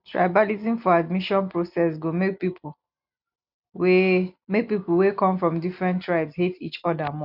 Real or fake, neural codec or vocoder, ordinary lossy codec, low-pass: real; none; none; 5.4 kHz